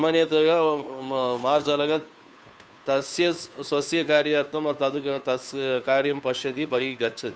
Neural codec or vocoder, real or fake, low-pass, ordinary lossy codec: codec, 16 kHz, 2 kbps, FunCodec, trained on Chinese and English, 25 frames a second; fake; none; none